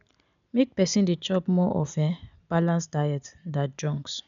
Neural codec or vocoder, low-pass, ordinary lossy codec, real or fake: none; 7.2 kHz; none; real